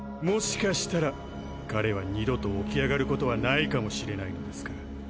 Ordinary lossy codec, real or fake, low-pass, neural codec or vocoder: none; real; none; none